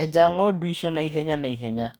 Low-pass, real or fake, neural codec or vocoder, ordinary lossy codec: none; fake; codec, 44.1 kHz, 2.6 kbps, DAC; none